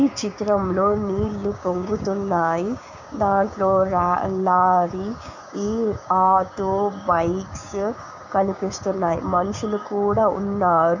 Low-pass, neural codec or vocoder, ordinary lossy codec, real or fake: 7.2 kHz; codec, 16 kHz, 6 kbps, DAC; none; fake